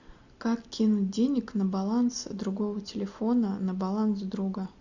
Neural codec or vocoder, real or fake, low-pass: none; real; 7.2 kHz